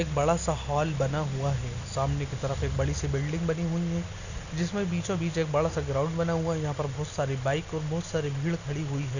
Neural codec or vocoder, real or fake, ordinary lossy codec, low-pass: none; real; none; 7.2 kHz